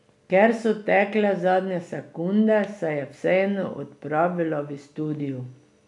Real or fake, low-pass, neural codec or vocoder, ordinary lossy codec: real; 10.8 kHz; none; none